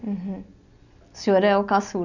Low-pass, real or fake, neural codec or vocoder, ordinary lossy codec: 7.2 kHz; real; none; none